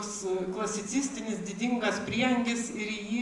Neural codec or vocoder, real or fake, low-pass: none; real; 10.8 kHz